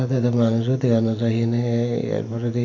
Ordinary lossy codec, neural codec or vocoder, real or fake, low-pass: none; vocoder, 44.1 kHz, 128 mel bands every 512 samples, BigVGAN v2; fake; 7.2 kHz